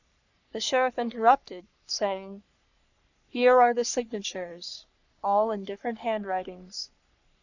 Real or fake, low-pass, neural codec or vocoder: fake; 7.2 kHz; codec, 44.1 kHz, 3.4 kbps, Pupu-Codec